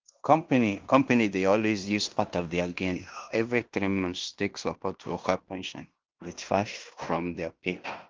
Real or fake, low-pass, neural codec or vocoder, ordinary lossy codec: fake; 7.2 kHz; codec, 16 kHz in and 24 kHz out, 0.9 kbps, LongCat-Audio-Codec, fine tuned four codebook decoder; Opus, 32 kbps